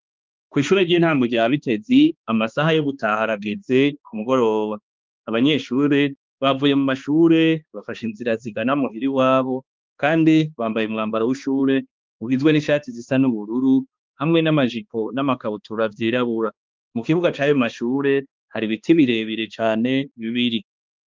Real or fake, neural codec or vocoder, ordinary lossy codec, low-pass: fake; codec, 16 kHz, 2 kbps, X-Codec, HuBERT features, trained on balanced general audio; Opus, 32 kbps; 7.2 kHz